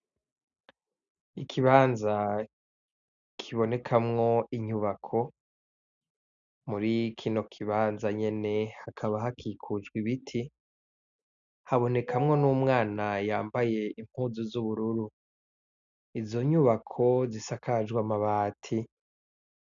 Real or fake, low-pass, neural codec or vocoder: real; 7.2 kHz; none